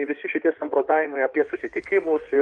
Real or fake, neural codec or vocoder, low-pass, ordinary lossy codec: fake; codec, 16 kHz in and 24 kHz out, 2.2 kbps, FireRedTTS-2 codec; 9.9 kHz; Opus, 32 kbps